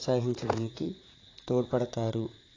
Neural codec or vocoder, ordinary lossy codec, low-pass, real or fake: vocoder, 44.1 kHz, 80 mel bands, Vocos; AAC, 32 kbps; 7.2 kHz; fake